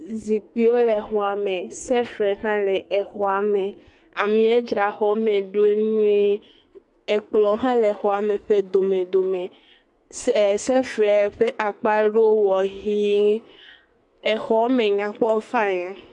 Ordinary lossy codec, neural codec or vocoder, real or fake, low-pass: MP3, 64 kbps; codec, 44.1 kHz, 2.6 kbps, SNAC; fake; 10.8 kHz